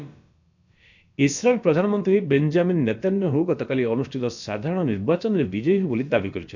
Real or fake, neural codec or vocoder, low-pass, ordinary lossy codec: fake; codec, 16 kHz, about 1 kbps, DyCAST, with the encoder's durations; 7.2 kHz; none